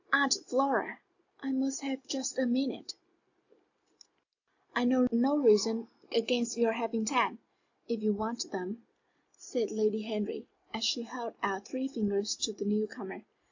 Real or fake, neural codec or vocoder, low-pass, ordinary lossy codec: real; none; 7.2 kHz; AAC, 48 kbps